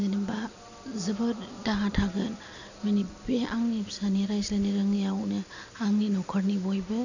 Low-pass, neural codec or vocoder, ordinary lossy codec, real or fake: 7.2 kHz; none; none; real